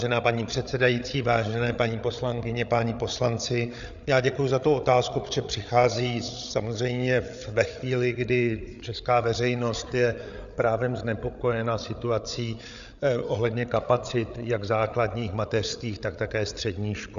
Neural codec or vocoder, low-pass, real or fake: codec, 16 kHz, 8 kbps, FreqCodec, larger model; 7.2 kHz; fake